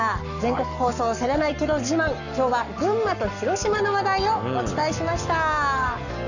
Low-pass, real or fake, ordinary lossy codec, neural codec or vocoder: 7.2 kHz; fake; none; codec, 44.1 kHz, 7.8 kbps, Pupu-Codec